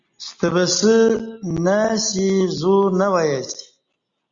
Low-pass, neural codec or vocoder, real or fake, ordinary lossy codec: 7.2 kHz; none; real; Opus, 64 kbps